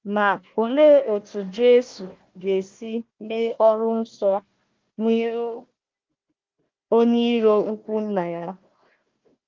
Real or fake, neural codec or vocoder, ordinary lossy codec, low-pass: fake; codec, 16 kHz, 1 kbps, FunCodec, trained on Chinese and English, 50 frames a second; Opus, 32 kbps; 7.2 kHz